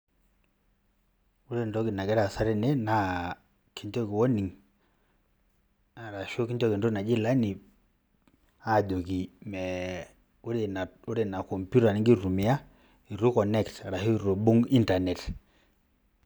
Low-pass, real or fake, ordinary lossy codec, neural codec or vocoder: none; real; none; none